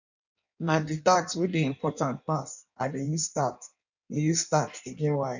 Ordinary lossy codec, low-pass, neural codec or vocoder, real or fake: none; 7.2 kHz; codec, 16 kHz in and 24 kHz out, 1.1 kbps, FireRedTTS-2 codec; fake